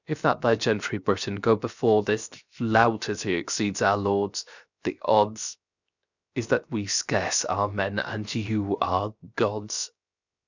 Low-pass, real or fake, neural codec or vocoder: 7.2 kHz; fake; codec, 16 kHz, 0.7 kbps, FocalCodec